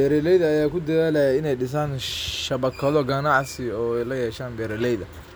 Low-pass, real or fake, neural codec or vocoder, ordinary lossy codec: none; real; none; none